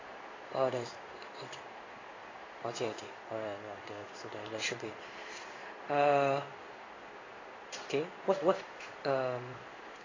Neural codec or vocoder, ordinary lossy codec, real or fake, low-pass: codec, 16 kHz in and 24 kHz out, 1 kbps, XY-Tokenizer; AAC, 32 kbps; fake; 7.2 kHz